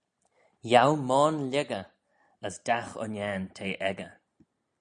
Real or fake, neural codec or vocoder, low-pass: real; none; 9.9 kHz